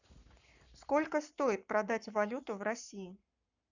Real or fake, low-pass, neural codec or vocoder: fake; 7.2 kHz; codec, 44.1 kHz, 7.8 kbps, Pupu-Codec